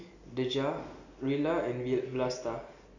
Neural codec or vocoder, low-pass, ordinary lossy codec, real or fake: none; 7.2 kHz; none; real